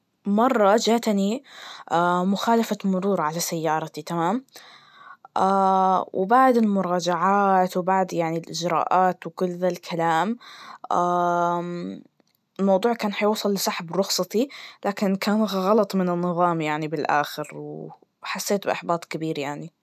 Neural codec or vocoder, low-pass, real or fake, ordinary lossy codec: none; 14.4 kHz; real; none